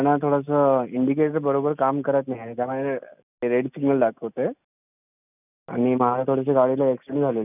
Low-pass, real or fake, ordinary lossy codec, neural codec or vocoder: 3.6 kHz; real; none; none